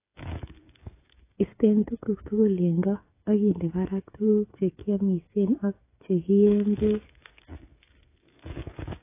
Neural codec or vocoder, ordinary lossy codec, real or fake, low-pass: codec, 16 kHz, 8 kbps, FreqCodec, smaller model; AAC, 24 kbps; fake; 3.6 kHz